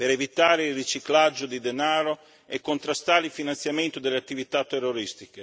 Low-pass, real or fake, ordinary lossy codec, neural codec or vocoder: none; real; none; none